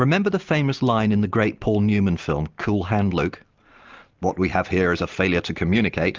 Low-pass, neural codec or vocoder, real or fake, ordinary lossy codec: 7.2 kHz; none; real; Opus, 24 kbps